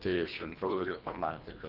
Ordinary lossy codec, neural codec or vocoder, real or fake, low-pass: Opus, 24 kbps; codec, 24 kHz, 1.5 kbps, HILCodec; fake; 5.4 kHz